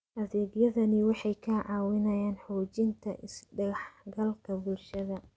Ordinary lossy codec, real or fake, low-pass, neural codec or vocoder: none; real; none; none